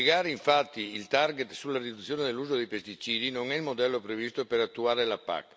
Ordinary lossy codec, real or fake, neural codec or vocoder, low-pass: none; real; none; none